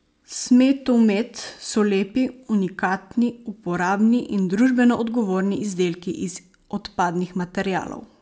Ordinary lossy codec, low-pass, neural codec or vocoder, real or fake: none; none; none; real